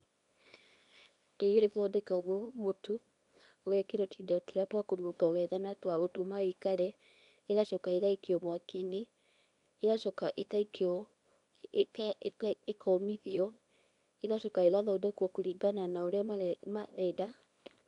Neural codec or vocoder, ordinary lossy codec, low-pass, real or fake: codec, 24 kHz, 0.9 kbps, WavTokenizer, small release; none; 10.8 kHz; fake